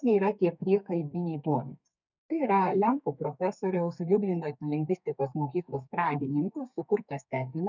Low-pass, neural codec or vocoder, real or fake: 7.2 kHz; codec, 32 kHz, 1.9 kbps, SNAC; fake